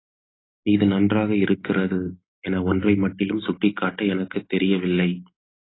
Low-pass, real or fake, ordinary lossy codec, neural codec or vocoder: 7.2 kHz; real; AAC, 16 kbps; none